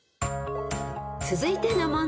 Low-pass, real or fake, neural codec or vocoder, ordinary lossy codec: none; real; none; none